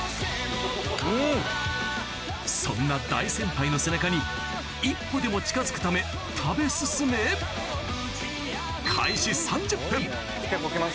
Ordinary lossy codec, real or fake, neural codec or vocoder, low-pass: none; real; none; none